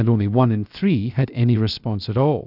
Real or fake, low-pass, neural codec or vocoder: fake; 5.4 kHz; codec, 16 kHz, 0.7 kbps, FocalCodec